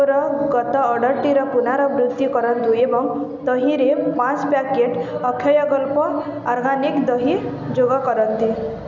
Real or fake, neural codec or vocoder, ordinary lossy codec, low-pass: real; none; none; 7.2 kHz